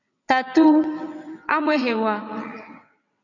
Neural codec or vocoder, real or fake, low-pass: vocoder, 22.05 kHz, 80 mel bands, WaveNeXt; fake; 7.2 kHz